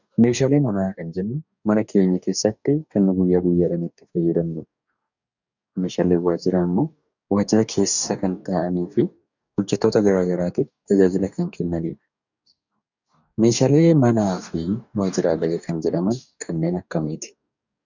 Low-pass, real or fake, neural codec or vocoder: 7.2 kHz; fake; codec, 44.1 kHz, 2.6 kbps, DAC